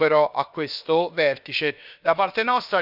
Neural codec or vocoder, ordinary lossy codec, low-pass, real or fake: codec, 16 kHz, about 1 kbps, DyCAST, with the encoder's durations; none; 5.4 kHz; fake